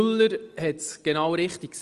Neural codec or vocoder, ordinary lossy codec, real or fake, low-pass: none; Opus, 24 kbps; real; 10.8 kHz